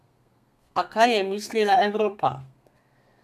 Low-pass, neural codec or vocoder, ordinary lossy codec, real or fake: 14.4 kHz; codec, 32 kHz, 1.9 kbps, SNAC; none; fake